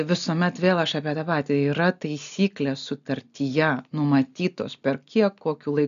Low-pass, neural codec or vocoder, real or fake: 7.2 kHz; none; real